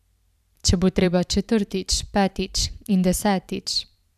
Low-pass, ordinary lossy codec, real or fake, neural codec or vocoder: 14.4 kHz; none; fake; vocoder, 44.1 kHz, 128 mel bands every 512 samples, BigVGAN v2